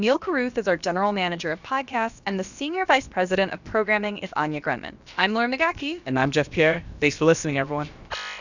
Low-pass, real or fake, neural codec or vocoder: 7.2 kHz; fake; codec, 16 kHz, about 1 kbps, DyCAST, with the encoder's durations